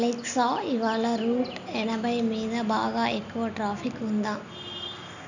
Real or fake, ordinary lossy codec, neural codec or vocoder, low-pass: real; none; none; 7.2 kHz